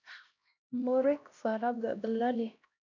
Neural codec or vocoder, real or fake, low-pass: codec, 16 kHz, 1 kbps, X-Codec, HuBERT features, trained on LibriSpeech; fake; 7.2 kHz